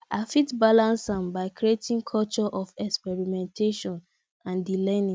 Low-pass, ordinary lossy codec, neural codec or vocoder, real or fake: none; none; none; real